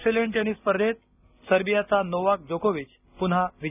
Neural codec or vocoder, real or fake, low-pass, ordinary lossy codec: none; real; 3.6 kHz; none